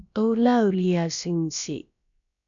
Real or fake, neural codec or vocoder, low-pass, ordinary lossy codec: fake; codec, 16 kHz, about 1 kbps, DyCAST, with the encoder's durations; 7.2 kHz; MP3, 96 kbps